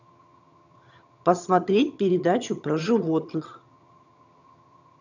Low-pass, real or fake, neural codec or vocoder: 7.2 kHz; fake; vocoder, 22.05 kHz, 80 mel bands, HiFi-GAN